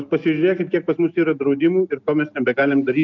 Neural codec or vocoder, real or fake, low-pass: none; real; 7.2 kHz